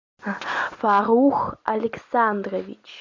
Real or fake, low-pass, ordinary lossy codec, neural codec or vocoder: real; 7.2 kHz; MP3, 64 kbps; none